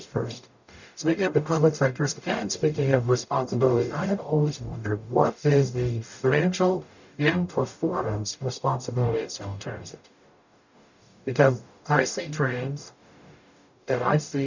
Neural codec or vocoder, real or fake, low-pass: codec, 44.1 kHz, 0.9 kbps, DAC; fake; 7.2 kHz